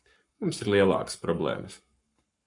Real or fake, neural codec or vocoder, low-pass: fake; codec, 44.1 kHz, 7.8 kbps, Pupu-Codec; 10.8 kHz